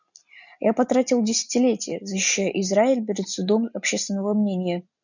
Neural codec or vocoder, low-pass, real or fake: none; 7.2 kHz; real